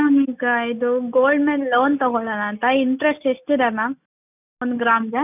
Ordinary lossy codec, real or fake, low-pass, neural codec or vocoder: none; real; 3.6 kHz; none